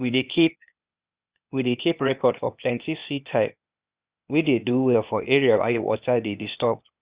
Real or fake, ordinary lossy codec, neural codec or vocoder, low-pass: fake; Opus, 64 kbps; codec, 16 kHz, 0.8 kbps, ZipCodec; 3.6 kHz